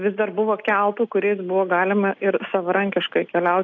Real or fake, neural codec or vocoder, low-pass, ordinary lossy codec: real; none; 7.2 kHz; AAC, 48 kbps